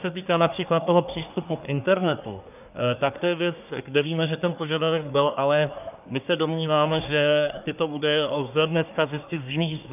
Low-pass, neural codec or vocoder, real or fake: 3.6 kHz; codec, 24 kHz, 1 kbps, SNAC; fake